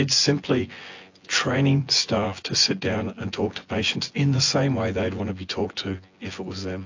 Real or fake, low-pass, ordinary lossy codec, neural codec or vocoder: fake; 7.2 kHz; AAC, 48 kbps; vocoder, 24 kHz, 100 mel bands, Vocos